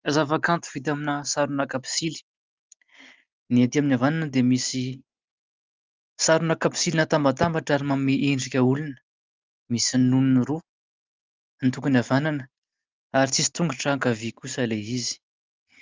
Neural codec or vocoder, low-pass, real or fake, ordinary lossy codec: none; 7.2 kHz; real; Opus, 32 kbps